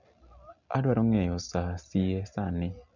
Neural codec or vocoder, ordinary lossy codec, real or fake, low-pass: none; none; real; 7.2 kHz